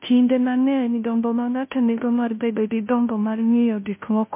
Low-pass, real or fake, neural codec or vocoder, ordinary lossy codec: 3.6 kHz; fake; codec, 16 kHz, 0.5 kbps, FunCodec, trained on Chinese and English, 25 frames a second; MP3, 24 kbps